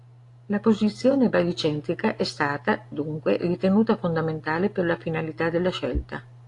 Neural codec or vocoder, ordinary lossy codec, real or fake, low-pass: none; AAC, 48 kbps; real; 10.8 kHz